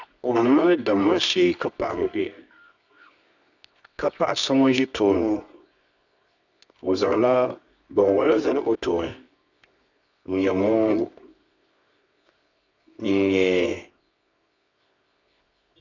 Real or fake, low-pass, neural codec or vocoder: fake; 7.2 kHz; codec, 24 kHz, 0.9 kbps, WavTokenizer, medium music audio release